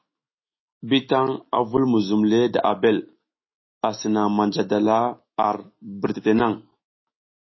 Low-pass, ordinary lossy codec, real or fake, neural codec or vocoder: 7.2 kHz; MP3, 24 kbps; fake; autoencoder, 48 kHz, 128 numbers a frame, DAC-VAE, trained on Japanese speech